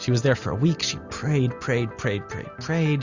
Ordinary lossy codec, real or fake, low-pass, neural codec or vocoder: Opus, 64 kbps; real; 7.2 kHz; none